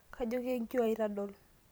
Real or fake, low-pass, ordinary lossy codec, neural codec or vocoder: fake; none; none; vocoder, 44.1 kHz, 128 mel bands every 256 samples, BigVGAN v2